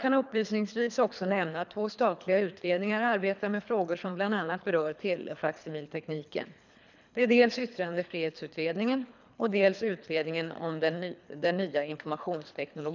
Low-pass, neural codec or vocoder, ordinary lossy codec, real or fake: 7.2 kHz; codec, 24 kHz, 3 kbps, HILCodec; none; fake